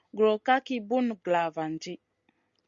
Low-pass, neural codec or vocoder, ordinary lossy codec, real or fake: 7.2 kHz; none; Opus, 64 kbps; real